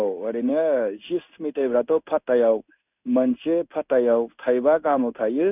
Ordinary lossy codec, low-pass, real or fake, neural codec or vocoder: none; 3.6 kHz; fake; codec, 16 kHz in and 24 kHz out, 1 kbps, XY-Tokenizer